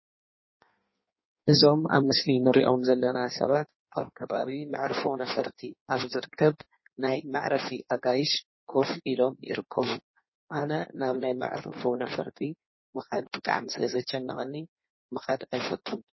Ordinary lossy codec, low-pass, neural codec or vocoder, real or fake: MP3, 24 kbps; 7.2 kHz; codec, 16 kHz in and 24 kHz out, 1.1 kbps, FireRedTTS-2 codec; fake